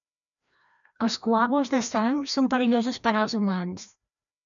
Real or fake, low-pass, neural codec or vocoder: fake; 7.2 kHz; codec, 16 kHz, 1 kbps, FreqCodec, larger model